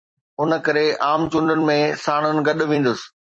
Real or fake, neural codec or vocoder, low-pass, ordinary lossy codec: fake; vocoder, 44.1 kHz, 128 mel bands every 256 samples, BigVGAN v2; 9.9 kHz; MP3, 32 kbps